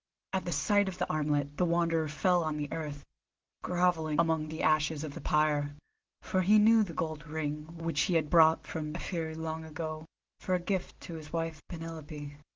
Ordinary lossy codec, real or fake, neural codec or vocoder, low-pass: Opus, 16 kbps; real; none; 7.2 kHz